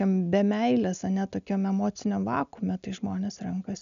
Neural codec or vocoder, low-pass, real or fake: none; 7.2 kHz; real